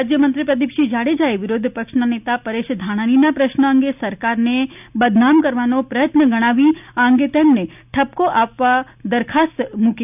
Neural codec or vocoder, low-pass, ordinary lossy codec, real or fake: none; 3.6 kHz; none; real